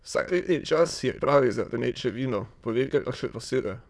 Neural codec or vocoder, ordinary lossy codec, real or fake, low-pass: autoencoder, 22.05 kHz, a latent of 192 numbers a frame, VITS, trained on many speakers; none; fake; none